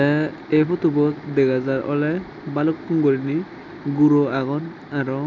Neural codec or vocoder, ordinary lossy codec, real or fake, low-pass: none; none; real; 7.2 kHz